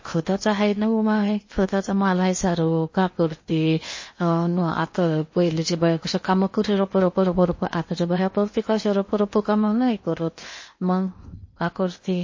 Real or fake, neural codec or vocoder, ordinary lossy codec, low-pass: fake; codec, 16 kHz in and 24 kHz out, 0.8 kbps, FocalCodec, streaming, 65536 codes; MP3, 32 kbps; 7.2 kHz